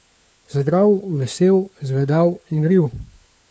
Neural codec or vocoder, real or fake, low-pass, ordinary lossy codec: codec, 16 kHz, 4 kbps, FunCodec, trained on LibriTTS, 50 frames a second; fake; none; none